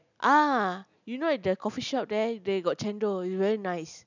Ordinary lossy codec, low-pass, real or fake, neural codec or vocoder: none; 7.2 kHz; real; none